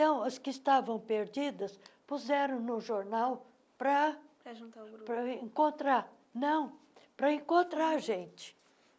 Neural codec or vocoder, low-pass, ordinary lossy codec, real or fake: none; none; none; real